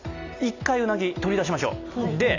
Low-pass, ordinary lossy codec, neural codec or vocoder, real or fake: 7.2 kHz; AAC, 48 kbps; none; real